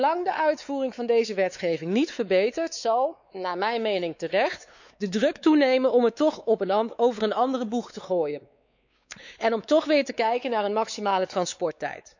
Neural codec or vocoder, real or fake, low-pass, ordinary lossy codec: codec, 16 kHz, 4 kbps, X-Codec, WavLM features, trained on Multilingual LibriSpeech; fake; 7.2 kHz; none